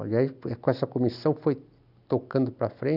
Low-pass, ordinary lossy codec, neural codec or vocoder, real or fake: 5.4 kHz; none; none; real